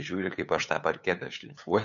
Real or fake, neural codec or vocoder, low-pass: fake; codec, 16 kHz, 8 kbps, FunCodec, trained on LibriTTS, 25 frames a second; 7.2 kHz